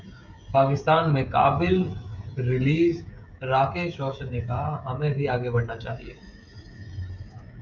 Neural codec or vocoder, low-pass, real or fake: codec, 16 kHz, 16 kbps, FreqCodec, smaller model; 7.2 kHz; fake